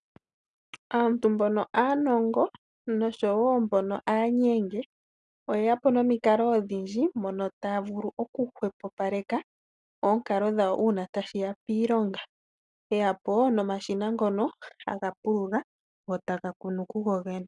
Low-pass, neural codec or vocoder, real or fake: 10.8 kHz; none; real